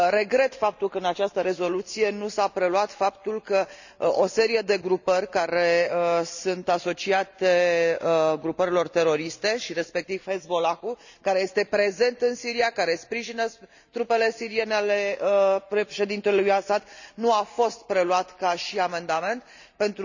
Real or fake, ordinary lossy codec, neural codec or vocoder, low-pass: real; none; none; 7.2 kHz